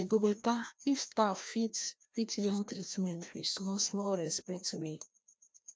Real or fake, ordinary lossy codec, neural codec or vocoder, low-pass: fake; none; codec, 16 kHz, 1 kbps, FreqCodec, larger model; none